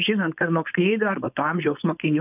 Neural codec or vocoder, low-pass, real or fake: codec, 16 kHz, 4.8 kbps, FACodec; 3.6 kHz; fake